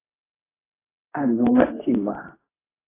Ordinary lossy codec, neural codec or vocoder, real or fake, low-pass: AAC, 16 kbps; codec, 24 kHz, 0.9 kbps, WavTokenizer, medium speech release version 2; fake; 3.6 kHz